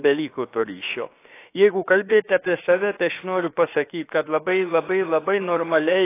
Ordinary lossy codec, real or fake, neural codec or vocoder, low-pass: AAC, 24 kbps; fake; codec, 16 kHz, about 1 kbps, DyCAST, with the encoder's durations; 3.6 kHz